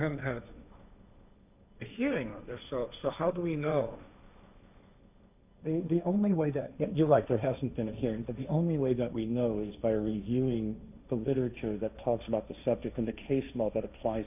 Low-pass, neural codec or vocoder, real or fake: 3.6 kHz; codec, 16 kHz, 1.1 kbps, Voila-Tokenizer; fake